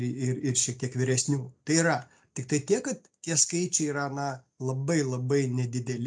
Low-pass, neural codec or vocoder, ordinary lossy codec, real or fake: 9.9 kHz; none; MP3, 64 kbps; real